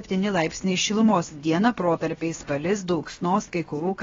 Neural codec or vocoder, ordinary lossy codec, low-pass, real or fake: codec, 16 kHz, about 1 kbps, DyCAST, with the encoder's durations; AAC, 24 kbps; 7.2 kHz; fake